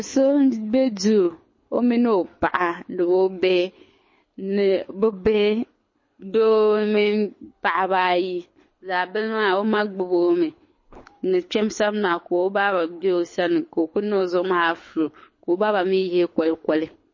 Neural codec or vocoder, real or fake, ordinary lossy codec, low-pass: codec, 24 kHz, 6 kbps, HILCodec; fake; MP3, 32 kbps; 7.2 kHz